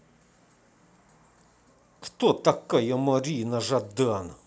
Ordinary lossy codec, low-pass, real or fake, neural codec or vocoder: none; none; real; none